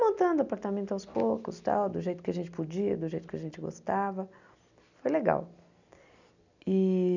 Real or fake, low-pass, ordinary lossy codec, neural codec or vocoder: real; 7.2 kHz; none; none